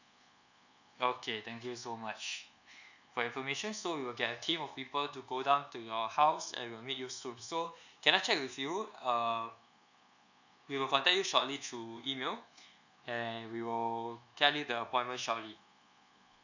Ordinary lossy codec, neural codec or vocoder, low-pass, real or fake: none; codec, 24 kHz, 1.2 kbps, DualCodec; 7.2 kHz; fake